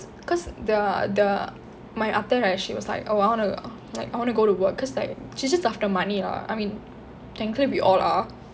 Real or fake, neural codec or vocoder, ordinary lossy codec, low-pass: real; none; none; none